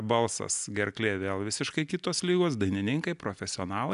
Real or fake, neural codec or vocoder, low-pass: real; none; 10.8 kHz